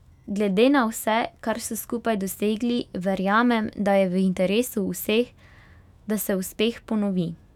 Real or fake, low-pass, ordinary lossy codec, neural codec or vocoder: fake; 19.8 kHz; none; autoencoder, 48 kHz, 128 numbers a frame, DAC-VAE, trained on Japanese speech